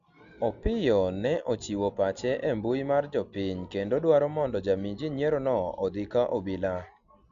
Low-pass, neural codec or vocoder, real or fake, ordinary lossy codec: 7.2 kHz; none; real; Opus, 64 kbps